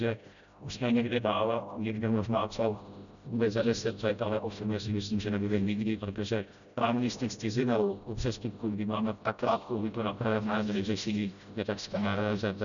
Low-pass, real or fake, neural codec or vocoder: 7.2 kHz; fake; codec, 16 kHz, 0.5 kbps, FreqCodec, smaller model